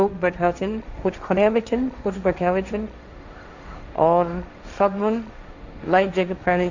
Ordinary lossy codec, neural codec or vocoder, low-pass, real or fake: Opus, 64 kbps; codec, 16 kHz, 1.1 kbps, Voila-Tokenizer; 7.2 kHz; fake